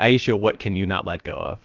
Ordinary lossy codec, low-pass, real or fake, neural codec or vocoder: Opus, 24 kbps; 7.2 kHz; fake; codec, 16 kHz, about 1 kbps, DyCAST, with the encoder's durations